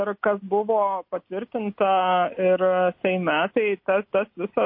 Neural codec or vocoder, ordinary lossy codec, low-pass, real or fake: none; MP3, 32 kbps; 5.4 kHz; real